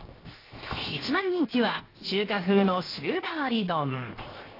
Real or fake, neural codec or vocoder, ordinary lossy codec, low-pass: fake; codec, 16 kHz, 0.7 kbps, FocalCodec; AAC, 24 kbps; 5.4 kHz